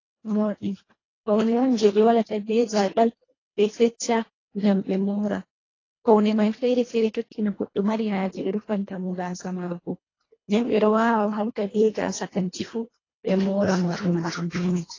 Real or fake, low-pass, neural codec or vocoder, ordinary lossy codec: fake; 7.2 kHz; codec, 24 kHz, 1.5 kbps, HILCodec; AAC, 32 kbps